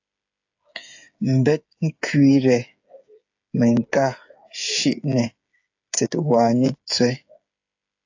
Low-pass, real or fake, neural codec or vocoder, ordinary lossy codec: 7.2 kHz; fake; codec, 16 kHz, 8 kbps, FreqCodec, smaller model; AAC, 48 kbps